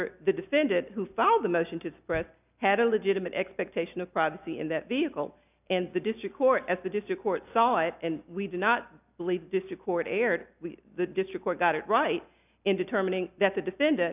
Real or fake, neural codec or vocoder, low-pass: real; none; 3.6 kHz